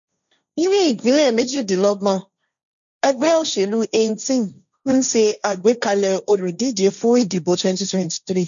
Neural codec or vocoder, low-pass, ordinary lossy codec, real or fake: codec, 16 kHz, 1.1 kbps, Voila-Tokenizer; 7.2 kHz; none; fake